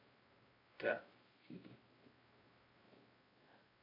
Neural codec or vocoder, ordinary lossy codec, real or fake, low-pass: codec, 16 kHz, 0.5 kbps, X-Codec, WavLM features, trained on Multilingual LibriSpeech; MP3, 24 kbps; fake; 5.4 kHz